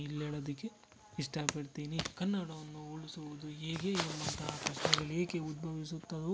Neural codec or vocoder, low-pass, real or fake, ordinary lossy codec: none; none; real; none